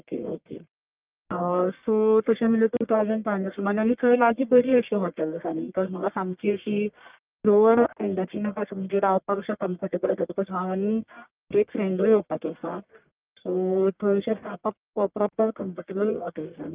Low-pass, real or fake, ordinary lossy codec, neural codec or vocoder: 3.6 kHz; fake; Opus, 24 kbps; codec, 44.1 kHz, 1.7 kbps, Pupu-Codec